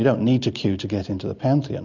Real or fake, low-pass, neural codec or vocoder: real; 7.2 kHz; none